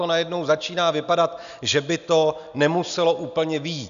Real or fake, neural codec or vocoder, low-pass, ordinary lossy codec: real; none; 7.2 kHz; MP3, 96 kbps